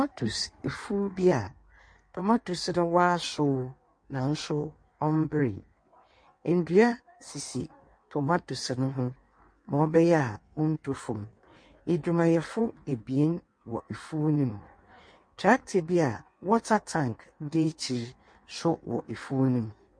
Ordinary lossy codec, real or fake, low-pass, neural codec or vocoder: MP3, 48 kbps; fake; 9.9 kHz; codec, 16 kHz in and 24 kHz out, 1.1 kbps, FireRedTTS-2 codec